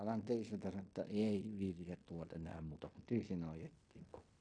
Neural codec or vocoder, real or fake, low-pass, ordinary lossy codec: codec, 16 kHz in and 24 kHz out, 0.9 kbps, LongCat-Audio-Codec, fine tuned four codebook decoder; fake; 9.9 kHz; AAC, 48 kbps